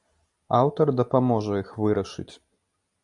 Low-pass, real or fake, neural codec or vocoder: 10.8 kHz; real; none